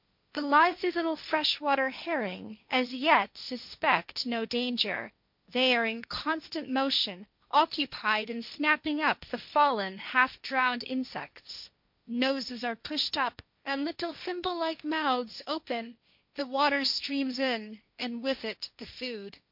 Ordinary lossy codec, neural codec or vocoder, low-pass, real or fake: MP3, 48 kbps; codec, 16 kHz, 1.1 kbps, Voila-Tokenizer; 5.4 kHz; fake